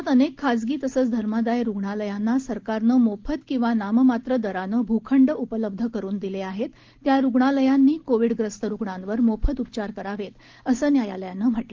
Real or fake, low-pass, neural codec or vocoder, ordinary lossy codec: real; 7.2 kHz; none; Opus, 16 kbps